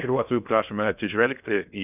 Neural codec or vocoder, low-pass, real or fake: codec, 16 kHz in and 24 kHz out, 0.6 kbps, FocalCodec, streaming, 2048 codes; 3.6 kHz; fake